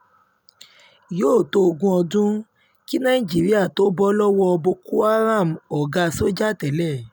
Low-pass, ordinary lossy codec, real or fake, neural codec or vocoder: none; none; real; none